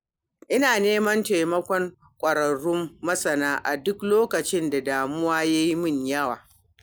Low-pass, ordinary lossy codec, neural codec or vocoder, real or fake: none; none; none; real